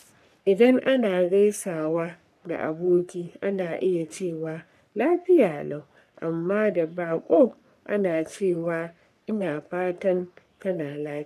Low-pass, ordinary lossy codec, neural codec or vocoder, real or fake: 14.4 kHz; none; codec, 44.1 kHz, 3.4 kbps, Pupu-Codec; fake